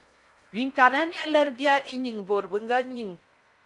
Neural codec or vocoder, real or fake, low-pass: codec, 16 kHz in and 24 kHz out, 0.6 kbps, FocalCodec, streaming, 4096 codes; fake; 10.8 kHz